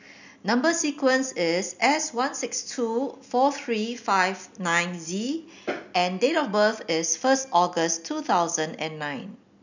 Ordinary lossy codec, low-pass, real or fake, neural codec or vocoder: none; 7.2 kHz; real; none